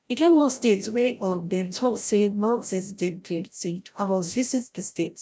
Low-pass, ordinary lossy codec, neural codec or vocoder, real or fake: none; none; codec, 16 kHz, 0.5 kbps, FreqCodec, larger model; fake